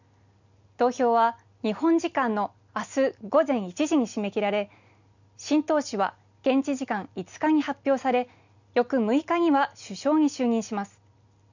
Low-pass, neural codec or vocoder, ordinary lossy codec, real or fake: 7.2 kHz; none; none; real